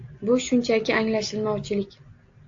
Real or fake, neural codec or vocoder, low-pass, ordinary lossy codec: real; none; 7.2 kHz; AAC, 48 kbps